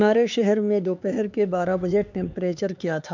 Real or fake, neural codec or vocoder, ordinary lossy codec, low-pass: fake; codec, 16 kHz, 4 kbps, X-Codec, HuBERT features, trained on LibriSpeech; MP3, 64 kbps; 7.2 kHz